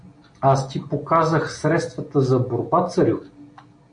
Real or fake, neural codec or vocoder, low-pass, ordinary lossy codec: real; none; 9.9 kHz; AAC, 64 kbps